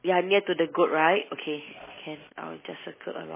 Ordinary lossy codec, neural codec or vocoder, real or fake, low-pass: MP3, 16 kbps; none; real; 3.6 kHz